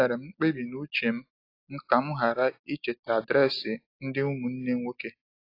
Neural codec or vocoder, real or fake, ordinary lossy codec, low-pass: none; real; AAC, 32 kbps; 5.4 kHz